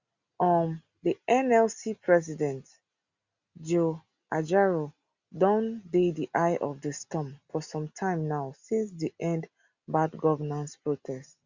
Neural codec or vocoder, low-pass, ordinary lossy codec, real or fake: none; 7.2 kHz; Opus, 64 kbps; real